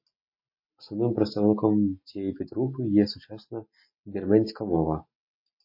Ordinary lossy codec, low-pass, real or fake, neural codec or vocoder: MP3, 32 kbps; 5.4 kHz; real; none